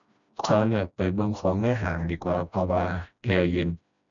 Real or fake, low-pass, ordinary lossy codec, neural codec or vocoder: fake; 7.2 kHz; none; codec, 16 kHz, 1 kbps, FreqCodec, smaller model